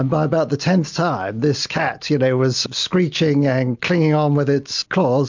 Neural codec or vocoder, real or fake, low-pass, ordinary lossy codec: none; real; 7.2 kHz; MP3, 64 kbps